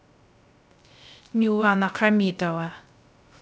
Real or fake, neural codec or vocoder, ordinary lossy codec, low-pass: fake; codec, 16 kHz, 0.3 kbps, FocalCodec; none; none